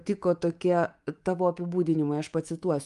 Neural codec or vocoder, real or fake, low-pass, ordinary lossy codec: codec, 24 kHz, 3.1 kbps, DualCodec; fake; 10.8 kHz; Opus, 32 kbps